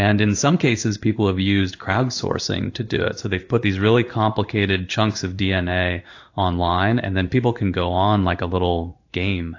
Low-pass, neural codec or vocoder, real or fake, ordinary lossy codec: 7.2 kHz; codec, 16 kHz in and 24 kHz out, 1 kbps, XY-Tokenizer; fake; AAC, 48 kbps